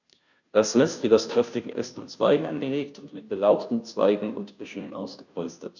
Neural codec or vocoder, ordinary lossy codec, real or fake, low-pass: codec, 16 kHz, 0.5 kbps, FunCodec, trained on Chinese and English, 25 frames a second; none; fake; 7.2 kHz